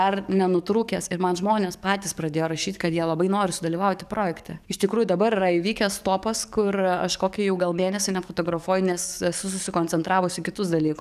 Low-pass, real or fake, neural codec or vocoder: 14.4 kHz; fake; codec, 44.1 kHz, 7.8 kbps, DAC